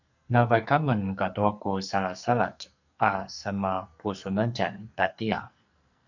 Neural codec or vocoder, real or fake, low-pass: codec, 44.1 kHz, 2.6 kbps, SNAC; fake; 7.2 kHz